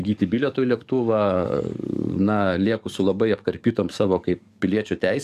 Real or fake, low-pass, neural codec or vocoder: fake; 14.4 kHz; codec, 44.1 kHz, 7.8 kbps, DAC